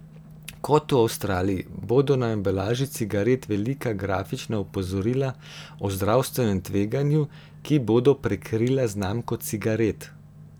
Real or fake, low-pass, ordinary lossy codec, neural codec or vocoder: real; none; none; none